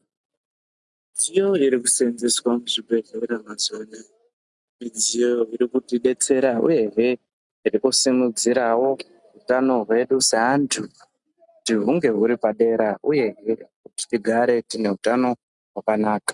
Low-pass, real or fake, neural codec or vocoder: 10.8 kHz; real; none